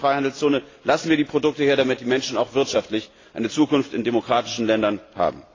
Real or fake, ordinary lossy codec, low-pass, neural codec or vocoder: real; AAC, 32 kbps; 7.2 kHz; none